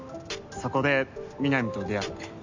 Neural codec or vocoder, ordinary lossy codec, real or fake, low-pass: none; MP3, 64 kbps; real; 7.2 kHz